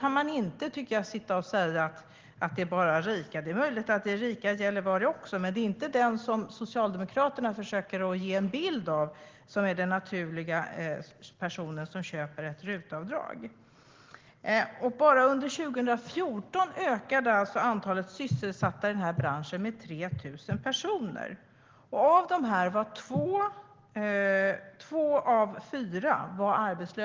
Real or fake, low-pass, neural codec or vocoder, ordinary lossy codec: real; 7.2 kHz; none; Opus, 16 kbps